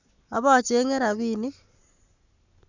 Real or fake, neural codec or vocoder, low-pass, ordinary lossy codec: fake; codec, 44.1 kHz, 7.8 kbps, Pupu-Codec; 7.2 kHz; none